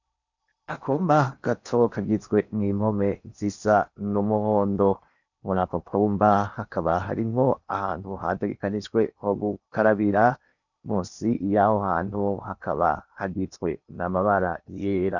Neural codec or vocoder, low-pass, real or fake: codec, 16 kHz in and 24 kHz out, 0.8 kbps, FocalCodec, streaming, 65536 codes; 7.2 kHz; fake